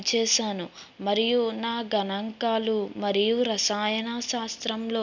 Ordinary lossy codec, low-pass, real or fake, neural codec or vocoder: none; 7.2 kHz; real; none